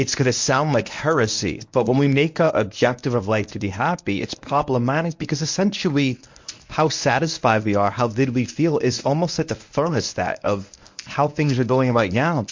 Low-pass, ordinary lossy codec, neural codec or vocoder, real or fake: 7.2 kHz; MP3, 48 kbps; codec, 24 kHz, 0.9 kbps, WavTokenizer, medium speech release version 1; fake